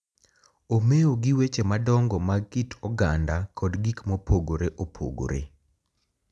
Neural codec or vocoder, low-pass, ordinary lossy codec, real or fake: none; none; none; real